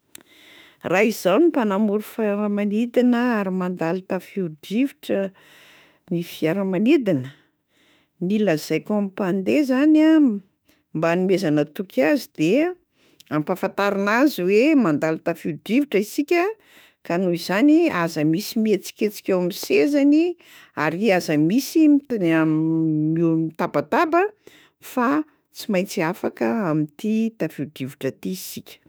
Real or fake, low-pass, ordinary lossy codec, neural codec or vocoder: fake; none; none; autoencoder, 48 kHz, 32 numbers a frame, DAC-VAE, trained on Japanese speech